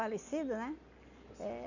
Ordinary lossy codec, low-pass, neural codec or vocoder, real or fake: none; 7.2 kHz; none; real